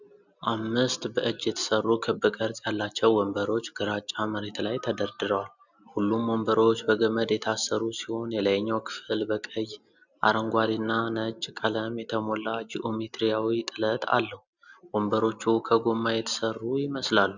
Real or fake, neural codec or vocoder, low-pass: real; none; 7.2 kHz